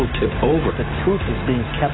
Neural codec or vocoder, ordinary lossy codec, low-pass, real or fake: none; AAC, 16 kbps; 7.2 kHz; real